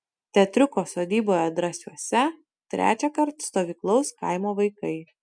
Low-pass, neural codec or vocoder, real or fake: 9.9 kHz; none; real